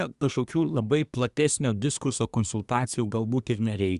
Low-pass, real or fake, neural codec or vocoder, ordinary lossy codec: 10.8 kHz; fake; codec, 24 kHz, 1 kbps, SNAC; Opus, 64 kbps